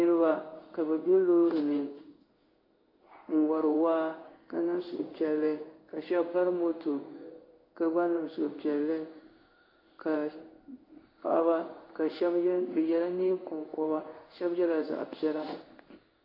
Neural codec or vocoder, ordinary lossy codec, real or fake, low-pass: codec, 16 kHz in and 24 kHz out, 1 kbps, XY-Tokenizer; AAC, 32 kbps; fake; 5.4 kHz